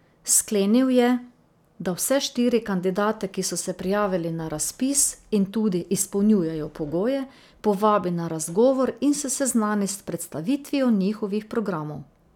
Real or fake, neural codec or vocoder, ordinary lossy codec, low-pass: real; none; none; 19.8 kHz